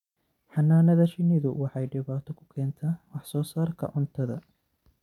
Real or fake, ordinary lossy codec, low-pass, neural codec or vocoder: real; none; 19.8 kHz; none